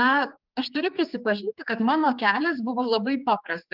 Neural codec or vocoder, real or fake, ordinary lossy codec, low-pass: codec, 16 kHz, 4 kbps, X-Codec, HuBERT features, trained on general audio; fake; Opus, 32 kbps; 5.4 kHz